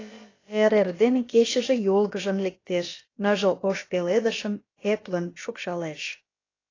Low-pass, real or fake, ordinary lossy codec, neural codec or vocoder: 7.2 kHz; fake; AAC, 32 kbps; codec, 16 kHz, about 1 kbps, DyCAST, with the encoder's durations